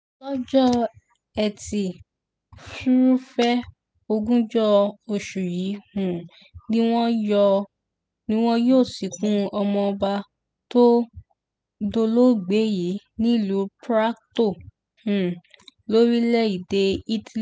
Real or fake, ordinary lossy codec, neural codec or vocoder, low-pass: real; none; none; none